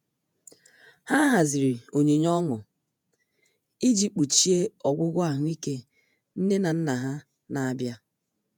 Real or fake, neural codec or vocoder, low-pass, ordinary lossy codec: fake; vocoder, 48 kHz, 128 mel bands, Vocos; none; none